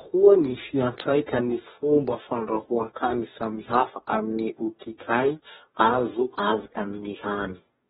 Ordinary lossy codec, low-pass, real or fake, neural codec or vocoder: AAC, 16 kbps; 19.8 kHz; fake; codec, 44.1 kHz, 2.6 kbps, DAC